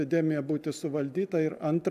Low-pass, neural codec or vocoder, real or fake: 14.4 kHz; none; real